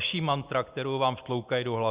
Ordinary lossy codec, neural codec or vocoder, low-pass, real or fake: Opus, 64 kbps; none; 3.6 kHz; real